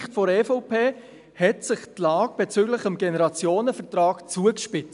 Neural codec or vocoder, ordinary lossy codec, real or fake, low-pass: none; none; real; 10.8 kHz